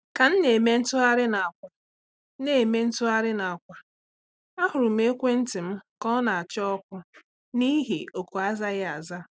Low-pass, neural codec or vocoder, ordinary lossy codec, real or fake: none; none; none; real